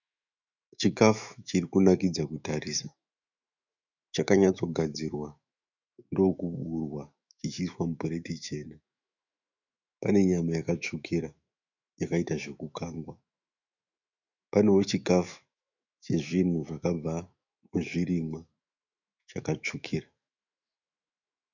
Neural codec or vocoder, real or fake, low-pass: autoencoder, 48 kHz, 128 numbers a frame, DAC-VAE, trained on Japanese speech; fake; 7.2 kHz